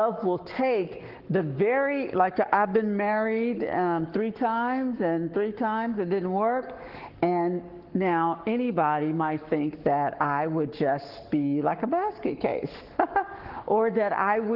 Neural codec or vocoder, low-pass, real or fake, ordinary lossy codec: codec, 44.1 kHz, 7.8 kbps, Pupu-Codec; 5.4 kHz; fake; Opus, 16 kbps